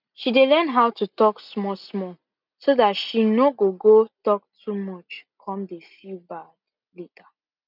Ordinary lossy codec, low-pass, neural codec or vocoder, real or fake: none; 5.4 kHz; none; real